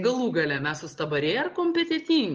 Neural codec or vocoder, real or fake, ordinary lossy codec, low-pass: none; real; Opus, 24 kbps; 7.2 kHz